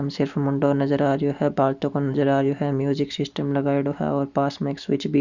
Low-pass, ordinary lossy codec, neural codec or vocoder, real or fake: 7.2 kHz; Opus, 64 kbps; none; real